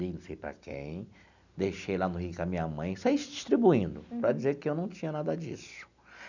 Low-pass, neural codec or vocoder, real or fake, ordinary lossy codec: 7.2 kHz; none; real; none